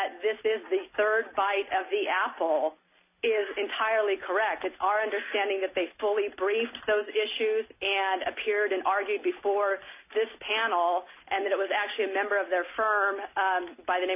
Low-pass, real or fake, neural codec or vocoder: 3.6 kHz; real; none